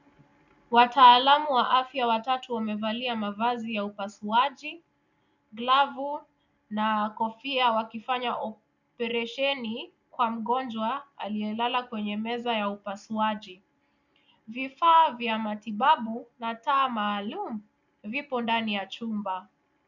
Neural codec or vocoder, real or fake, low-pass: none; real; 7.2 kHz